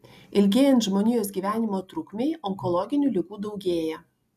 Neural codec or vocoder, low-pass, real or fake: none; 14.4 kHz; real